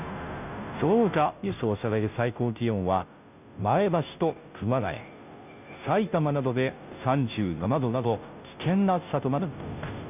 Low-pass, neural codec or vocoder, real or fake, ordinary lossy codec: 3.6 kHz; codec, 16 kHz, 0.5 kbps, FunCodec, trained on Chinese and English, 25 frames a second; fake; none